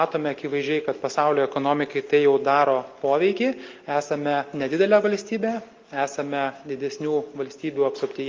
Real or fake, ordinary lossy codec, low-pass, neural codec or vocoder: real; Opus, 24 kbps; 7.2 kHz; none